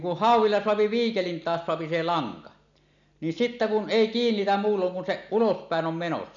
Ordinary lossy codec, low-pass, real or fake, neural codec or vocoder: none; 7.2 kHz; real; none